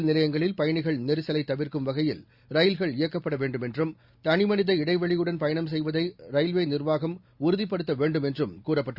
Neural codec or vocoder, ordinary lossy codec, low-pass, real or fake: none; Opus, 64 kbps; 5.4 kHz; real